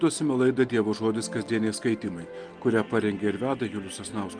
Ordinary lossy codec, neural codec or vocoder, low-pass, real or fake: Opus, 32 kbps; none; 9.9 kHz; real